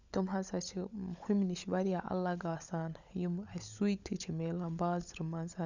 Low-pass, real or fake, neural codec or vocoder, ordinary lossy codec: 7.2 kHz; fake; codec, 16 kHz, 16 kbps, FunCodec, trained on LibriTTS, 50 frames a second; none